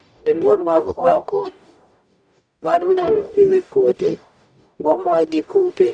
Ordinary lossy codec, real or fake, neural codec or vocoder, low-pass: none; fake; codec, 44.1 kHz, 0.9 kbps, DAC; 9.9 kHz